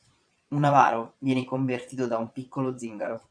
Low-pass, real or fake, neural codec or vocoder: 9.9 kHz; fake; vocoder, 22.05 kHz, 80 mel bands, Vocos